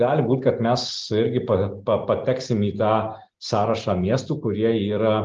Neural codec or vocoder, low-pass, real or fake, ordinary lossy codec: none; 7.2 kHz; real; Opus, 24 kbps